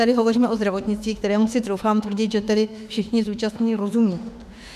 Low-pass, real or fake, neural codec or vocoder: 14.4 kHz; fake; autoencoder, 48 kHz, 32 numbers a frame, DAC-VAE, trained on Japanese speech